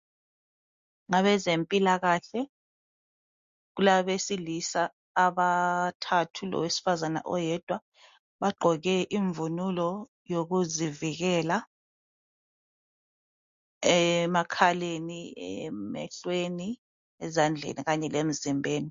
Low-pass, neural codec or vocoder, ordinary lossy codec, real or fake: 7.2 kHz; none; MP3, 48 kbps; real